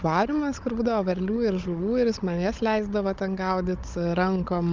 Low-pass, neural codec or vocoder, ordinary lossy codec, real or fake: 7.2 kHz; codec, 16 kHz, 16 kbps, FunCodec, trained on Chinese and English, 50 frames a second; Opus, 24 kbps; fake